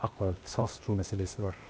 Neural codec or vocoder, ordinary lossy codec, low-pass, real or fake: codec, 16 kHz, 0.8 kbps, ZipCodec; none; none; fake